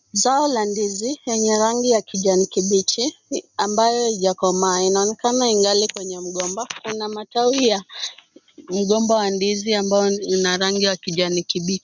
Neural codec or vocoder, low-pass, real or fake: none; 7.2 kHz; real